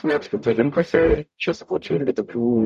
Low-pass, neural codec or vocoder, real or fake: 14.4 kHz; codec, 44.1 kHz, 0.9 kbps, DAC; fake